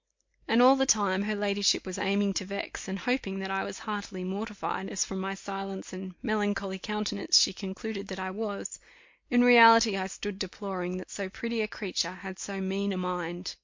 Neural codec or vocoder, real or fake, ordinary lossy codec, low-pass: none; real; MP3, 48 kbps; 7.2 kHz